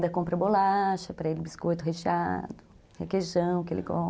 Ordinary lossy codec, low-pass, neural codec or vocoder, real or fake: none; none; none; real